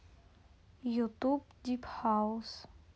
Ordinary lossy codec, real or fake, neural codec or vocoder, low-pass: none; real; none; none